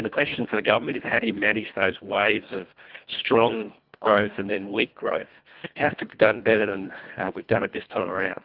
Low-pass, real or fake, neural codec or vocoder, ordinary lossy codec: 5.4 kHz; fake; codec, 24 kHz, 1.5 kbps, HILCodec; Opus, 24 kbps